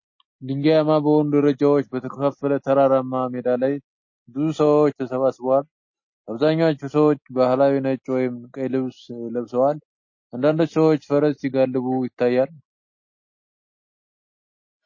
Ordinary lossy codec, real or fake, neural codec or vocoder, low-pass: MP3, 32 kbps; real; none; 7.2 kHz